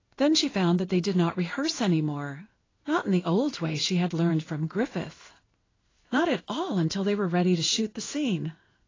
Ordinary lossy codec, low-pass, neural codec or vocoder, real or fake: AAC, 32 kbps; 7.2 kHz; codec, 16 kHz in and 24 kHz out, 1 kbps, XY-Tokenizer; fake